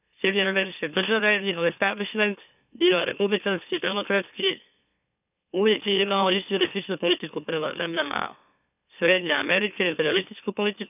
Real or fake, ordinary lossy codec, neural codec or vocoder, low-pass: fake; none; autoencoder, 44.1 kHz, a latent of 192 numbers a frame, MeloTTS; 3.6 kHz